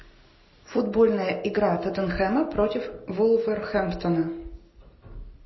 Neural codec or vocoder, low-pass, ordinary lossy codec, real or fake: none; 7.2 kHz; MP3, 24 kbps; real